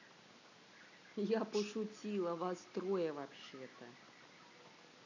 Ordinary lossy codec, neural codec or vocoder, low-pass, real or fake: none; none; 7.2 kHz; real